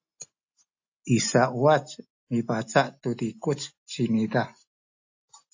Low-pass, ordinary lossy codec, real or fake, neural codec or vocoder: 7.2 kHz; AAC, 48 kbps; real; none